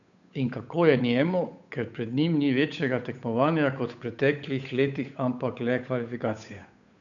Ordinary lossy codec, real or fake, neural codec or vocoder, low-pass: none; fake; codec, 16 kHz, 8 kbps, FunCodec, trained on Chinese and English, 25 frames a second; 7.2 kHz